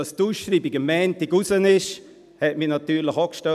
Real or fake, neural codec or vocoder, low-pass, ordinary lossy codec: real; none; 14.4 kHz; none